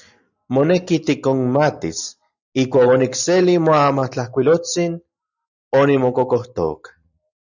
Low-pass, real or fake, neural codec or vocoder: 7.2 kHz; real; none